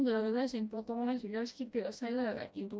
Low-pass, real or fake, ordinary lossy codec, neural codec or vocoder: none; fake; none; codec, 16 kHz, 1 kbps, FreqCodec, smaller model